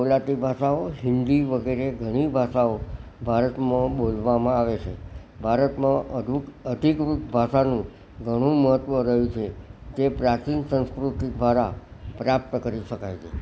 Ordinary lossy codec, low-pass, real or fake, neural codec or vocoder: none; none; real; none